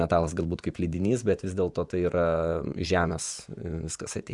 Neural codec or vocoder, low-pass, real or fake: vocoder, 24 kHz, 100 mel bands, Vocos; 10.8 kHz; fake